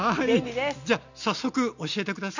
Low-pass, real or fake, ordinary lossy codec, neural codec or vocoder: 7.2 kHz; real; none; none